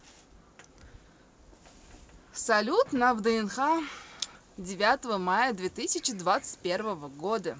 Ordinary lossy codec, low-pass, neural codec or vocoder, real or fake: none; none; none; real